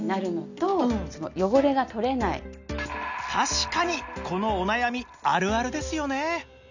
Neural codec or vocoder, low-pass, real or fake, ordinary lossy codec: none; 7.2 kHz; real; none